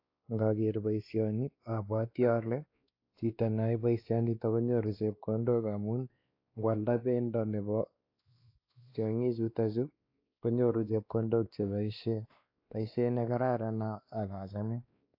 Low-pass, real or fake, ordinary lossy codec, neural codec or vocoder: 5.4 kHz; fake; AAC, 32 kbps; codec, 16 kHz, 2 kbps, X-Codec, WavLM features, trained on Multilingual LibriSpeech